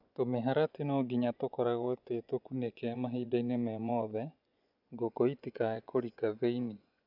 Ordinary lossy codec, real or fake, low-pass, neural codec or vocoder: none; fake; 5.4 kHz; vocoder, 24 kHz, 100 mel bands, Vocos